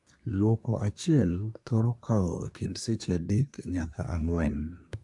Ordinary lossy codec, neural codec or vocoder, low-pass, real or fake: AAC, 64 kbps; codec, 24 kHz, 1 kbps, SNAC; 10.8 kHz; fake